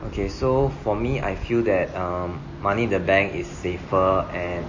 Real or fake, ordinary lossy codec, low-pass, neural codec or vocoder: real; MP3, 32 kbps; 7.2 kHz; none